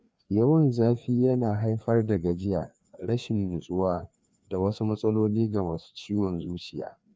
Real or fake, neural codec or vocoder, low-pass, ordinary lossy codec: fake; codec, 16 kHz, 2 kbps, FreqCodec, larger model; none; none